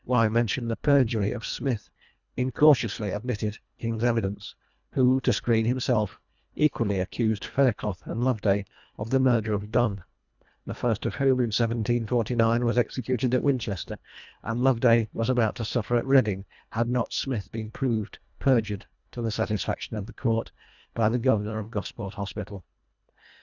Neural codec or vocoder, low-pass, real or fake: codec, 24 kHz, 1.5 kbps, HILCodec; 7.2 kHz; fake